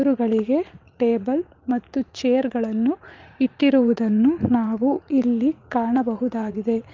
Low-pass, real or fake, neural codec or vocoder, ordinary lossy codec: 7.2 kHz; fake; vocoder, 44.1 kHz, 80 mel bands, Vocos; Opus, 24 kbps